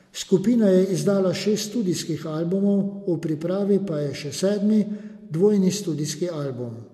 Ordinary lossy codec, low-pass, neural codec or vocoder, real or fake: MP3, 64 kbps; 14.4 kHz; none; real